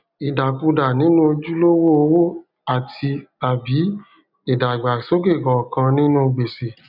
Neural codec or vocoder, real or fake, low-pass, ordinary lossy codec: none; real; 5.4 kHz; none